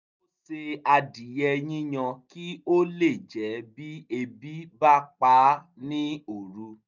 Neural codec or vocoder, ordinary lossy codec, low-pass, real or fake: none; none; 7.2 kHz; real